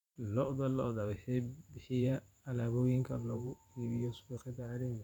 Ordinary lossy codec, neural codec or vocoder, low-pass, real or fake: none; vocoder, 44.1 kHz, 128 mel bands every 512 samples, BigVGAN v2; 19.8 kHz; fake